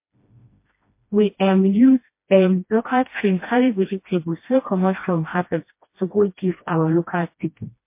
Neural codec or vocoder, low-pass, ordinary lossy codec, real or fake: codec, 16 kHz, 1 kbps, FreqCodec, smaller model; 3.6 kHz; MP3, 24 kbps; fake